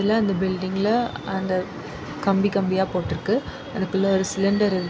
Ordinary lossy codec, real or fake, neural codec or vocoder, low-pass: none; real; none; none